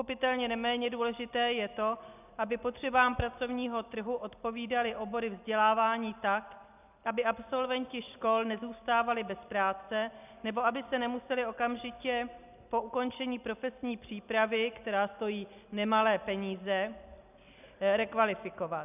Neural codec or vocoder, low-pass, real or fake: none; 3.6 kHz; real